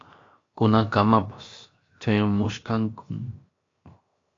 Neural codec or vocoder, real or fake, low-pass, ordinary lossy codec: codec, 16 kHz, 0.7 kbps, FocalCodec; fake; 7.2 kHz; AAC, 32 kbps